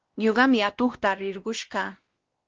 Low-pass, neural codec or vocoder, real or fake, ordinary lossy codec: 7.2 kHz; codec, 16 kHz, 1 kbps, X-Codec, WavLM features, trained on Multilingual LibriSpeech; fake; Opus, 16 kbps